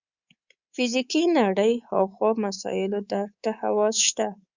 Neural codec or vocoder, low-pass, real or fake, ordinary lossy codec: codec, 24 kHz, 3.1 kbps, DualCodec; 7.2 kHz; fake; Opus, 64 kbps